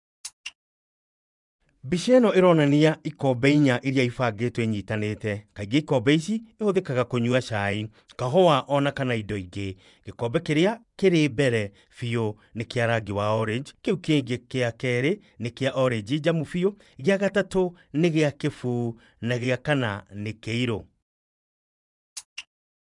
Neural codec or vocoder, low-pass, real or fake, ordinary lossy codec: vocoder, 24 kHz, 100 mel bands, Vocos; 10.8 kHz; fake; none